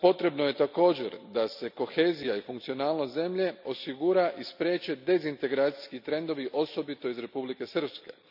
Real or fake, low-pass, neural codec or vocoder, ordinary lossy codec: real; 5.4 kHz; none; none